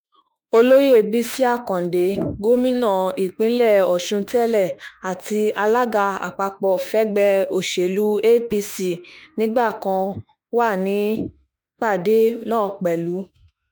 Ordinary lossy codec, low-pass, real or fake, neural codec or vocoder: none; none; fake; autoencoder, 48 kHz, 32 numbers a frame, DAC-VAE, trained on Japanese speech